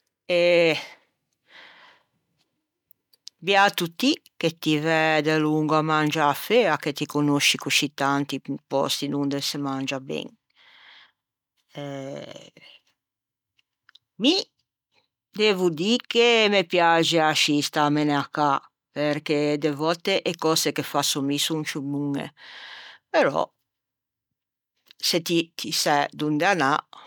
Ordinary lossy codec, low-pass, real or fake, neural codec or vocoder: none; 19.8 kHz; real; none